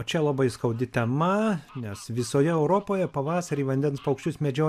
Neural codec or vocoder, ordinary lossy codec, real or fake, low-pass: none; AAC, 96 kbps; real; 14.4 kHz